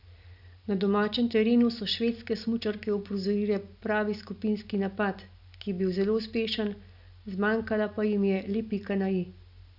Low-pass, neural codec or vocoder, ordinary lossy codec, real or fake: 5.4 kHz; none; none; real